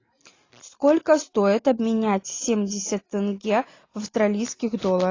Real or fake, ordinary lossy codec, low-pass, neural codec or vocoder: real; AAC, 32 kbps; 7.2 kHz; none